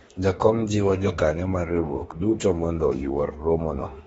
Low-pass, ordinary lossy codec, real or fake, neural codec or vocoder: 19.8 kHz; AAC, 24 kbps; fake; autoencoder, 48 kHz, 32 numbers a frame, DAC-VAE, trained on Japanese speech